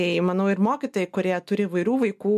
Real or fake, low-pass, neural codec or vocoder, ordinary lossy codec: real; 14.4 kHz; none; MP3, 64 kbps